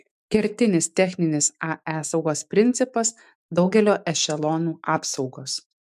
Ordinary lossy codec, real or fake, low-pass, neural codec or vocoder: MP3, 96 kbps; fake; 14.4 kHz; autoencoder, 48 kHz, 128 numbers a frame, DAC-VAE, trained on Japanese speech